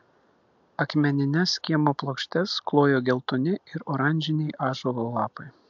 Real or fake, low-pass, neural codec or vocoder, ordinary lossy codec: real; 7.2 kHz; none; MP3, 64 kbps